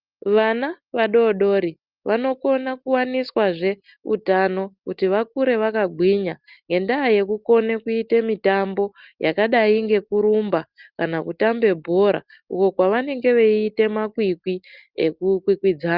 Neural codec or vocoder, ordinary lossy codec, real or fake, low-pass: none; Opus, 24 kbps; real; 5.4 kHz